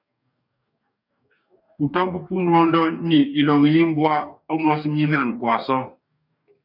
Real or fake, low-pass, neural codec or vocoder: fake; 5.4 kHz; codec, 44.1 kHz, 2.6 kbps, DAC